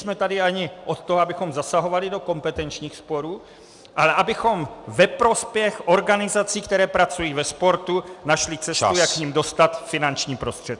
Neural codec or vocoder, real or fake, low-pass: none; real; 10.8 kHz